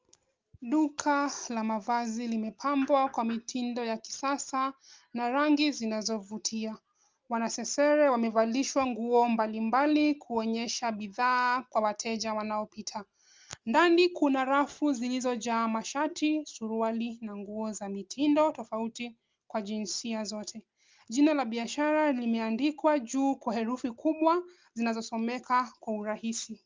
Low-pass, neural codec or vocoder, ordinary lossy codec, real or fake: 7.2 kHz; none; Opus, 32 kbps; real